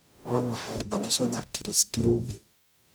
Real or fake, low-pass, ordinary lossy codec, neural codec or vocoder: fake; none; none; codec, 44.1 kHz, 0.9 kbps, DAC